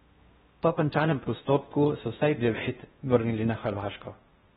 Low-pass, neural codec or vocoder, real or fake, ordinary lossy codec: 10.8 kHz; codec, 16 kHz in and 24 kHz out, 0.6 kbps, FocalCodec, streaming, 4096 codes; fake; AAC, 16 kbps